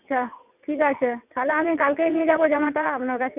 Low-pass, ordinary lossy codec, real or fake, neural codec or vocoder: 3.6 kHz; none; fake; vocoder, 22.05 kHz, 80 mel bands, WaveNeXt